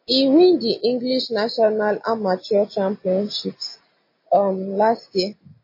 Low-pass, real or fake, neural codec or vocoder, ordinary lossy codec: 5.4 kHz; real; none; MP3, 24 kbps